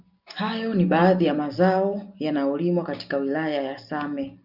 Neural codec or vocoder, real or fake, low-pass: none; real; 5.4 kHz